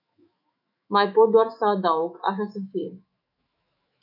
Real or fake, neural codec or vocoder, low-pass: fake; autoencoder, 48 kHz, 128 numbers a frame, DAC-VAE, trained on Japanese speech; 5.4 kHz